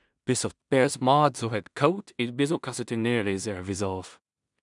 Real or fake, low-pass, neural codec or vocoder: fake; 10.8 kHz; codec, 16 kHz in and 24 kHz out, 0.4 kbps, LongCat-Audio-Codec, two codebook decoder